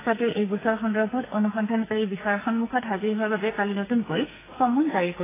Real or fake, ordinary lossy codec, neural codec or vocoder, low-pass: fake; AAC, 16 kbps; codec, 44.1 kHz, 2.6 kbps, SNAC; 3.6 kHz